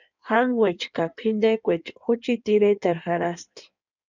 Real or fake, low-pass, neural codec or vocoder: fake; 7.2 kHz; codec, 16 kHz in and 24 kHz out, 1.1 kbps, FireRedTTS-2 codec